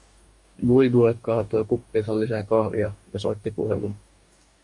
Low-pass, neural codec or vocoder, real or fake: 10.8 kHz; codec, 44.1 kHz, 2.6 kbps, DAC; fake